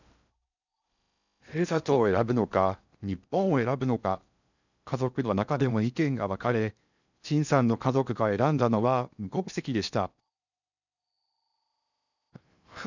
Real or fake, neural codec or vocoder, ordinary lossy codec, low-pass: fake; codec, 16 kHz in and 24 kHz out, 0.6 kbps, FocalCodec, streaming, 4096 codes; none; 7.2 kHz